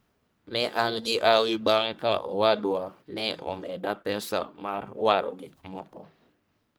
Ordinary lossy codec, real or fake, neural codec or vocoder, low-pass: none; fake; codec, 44.1 kHz, 1.7 kbps, Pupu-Codec; none